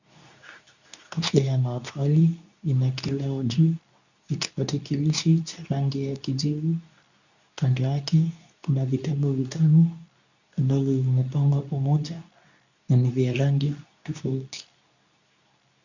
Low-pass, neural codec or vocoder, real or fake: 7.2 kHz; codec, 24 kHz, 0.9 kbps, WavTokenizer, medium speech release version 2; fake